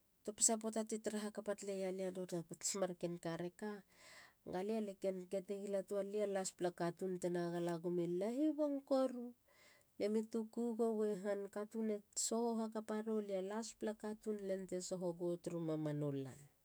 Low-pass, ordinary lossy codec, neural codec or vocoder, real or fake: none; none; autoencoder, 48 kHz, 128 numbers a frame, DAC-VAE, trained on Japanese speech; fake